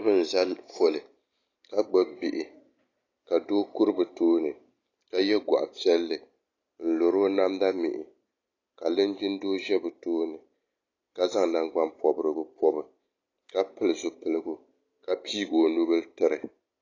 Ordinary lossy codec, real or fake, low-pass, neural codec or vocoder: AAC, 32 kbps; real; 7.2 kHz; none